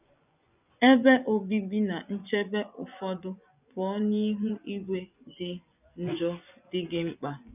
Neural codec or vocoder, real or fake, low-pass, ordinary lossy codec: vocoder, 24 kHz, 100 mel bands, Vocos; fake; 3.6 kHz; none